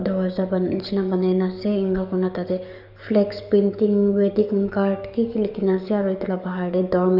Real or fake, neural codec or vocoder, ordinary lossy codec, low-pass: fake; codec, 44.1 kHz, 7.8 kbps, DAC; none; 5.4 kHz